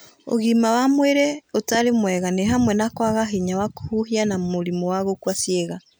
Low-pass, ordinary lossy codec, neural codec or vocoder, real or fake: none; none; none; real